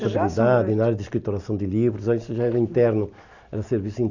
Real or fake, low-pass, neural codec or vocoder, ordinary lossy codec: real; 7.2 kHz; none; none